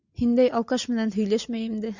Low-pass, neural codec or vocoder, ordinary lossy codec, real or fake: 7.2 kHz; none; Opus, 64 kbps; real